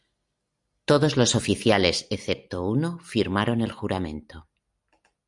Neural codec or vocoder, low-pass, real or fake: none; 10.8 kHz; real